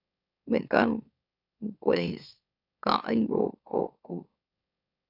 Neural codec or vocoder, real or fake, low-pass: autoencoder, 44.1 kHz, a latent of 192 numbers a frame, MeloTTS; fake; 5.4 kHz